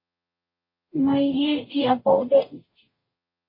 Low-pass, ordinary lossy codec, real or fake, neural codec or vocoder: 5.4 kHz; MP3, 24 kbps; fake; codec, 44.1 kHz, 0.9 kbps, DAC